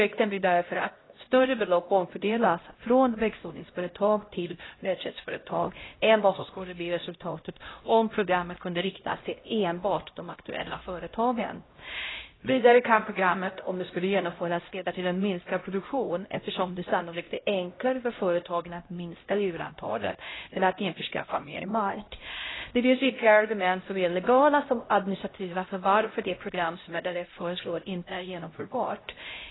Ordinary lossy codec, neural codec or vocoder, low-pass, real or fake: AAC, 16 kbps; codec, 16 kHz, 0.5 kbps, X-Codec, HuBERT features, trained on LibriSpeech; 7.2 kHz; fake